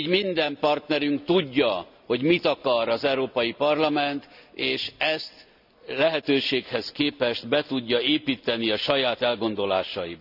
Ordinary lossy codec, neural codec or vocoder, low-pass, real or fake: none; none; 5.4 kHz; real